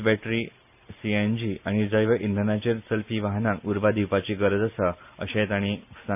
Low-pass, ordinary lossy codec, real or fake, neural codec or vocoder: 3.6 kHz; none; real; none